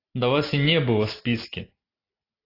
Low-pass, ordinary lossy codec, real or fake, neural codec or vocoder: 5.4 kHz; AAC, 24 kbps; real; none